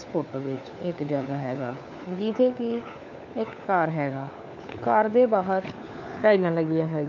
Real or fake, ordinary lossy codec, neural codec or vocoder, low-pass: fake; none; codec, 16 kHz, 4 kbps, FunCodec, trained on LibriTTS, 50 frames a second; 7.2 kHz